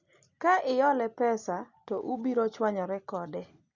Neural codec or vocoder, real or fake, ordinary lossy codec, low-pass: none; real; Opus, 64 kbps; 7.2 kHz